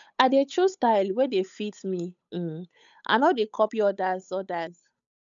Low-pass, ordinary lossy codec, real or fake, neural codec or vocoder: 7.2 kHz; none; fake; codec, 16 kHz, 8 kbps, FunCodec, trained on Chinese and English, 25 frames a second